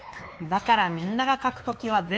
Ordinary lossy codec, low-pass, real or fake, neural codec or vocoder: none; none; fake; codec, 16 kHz, 2 kbps, X-Codec, WavLM features, trained on Multilingual LibriSpeech